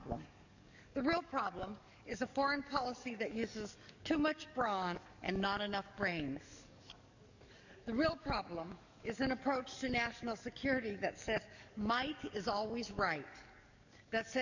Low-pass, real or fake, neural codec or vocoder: 7.2 kHz; fake; codec, 44.1 kHz, 7.8 kbps, DAC